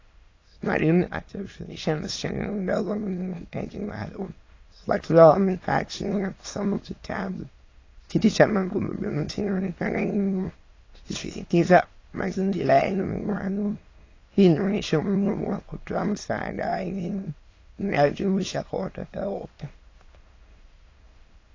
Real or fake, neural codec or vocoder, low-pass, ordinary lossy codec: fake; autoencoder, 22.05 kHz, a latent of 192 numbers a frame, VITS, trained on many speakers; 7.2 kHz; AAC, 32 kbps